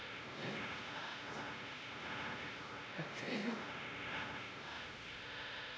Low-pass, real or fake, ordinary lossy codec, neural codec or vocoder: none; fake; none; codec, 16 kHz, 0.5 kbps, X-Codec, WavLM features, trained on Multilingual LibriSpeech